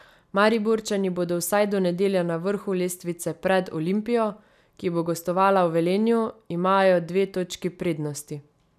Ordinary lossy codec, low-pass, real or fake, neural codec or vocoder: none; 14.4 kHz; real; none